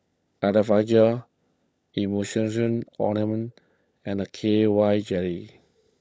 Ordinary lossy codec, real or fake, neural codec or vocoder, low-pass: none; fake; codec, 16 kHz, 16 kbps, FunCodec, trained on LibriTTS, 50 frames a second; none